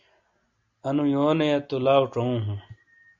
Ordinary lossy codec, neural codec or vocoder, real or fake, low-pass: MP3, 64 kbps; none; real; 7.2 kHz